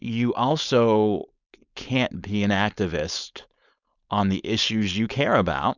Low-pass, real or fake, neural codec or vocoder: 7.2 kHz; fake; codec, 16 kHz, 4.8 kbps, FACodec